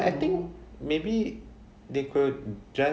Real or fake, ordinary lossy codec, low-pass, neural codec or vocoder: real; none; none; none